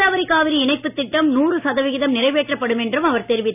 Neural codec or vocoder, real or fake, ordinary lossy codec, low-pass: none; real; none; 3.6 kHz